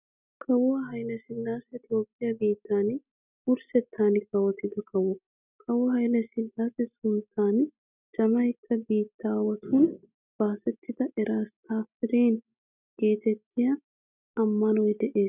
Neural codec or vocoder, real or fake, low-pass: none; real; 3.6 kHz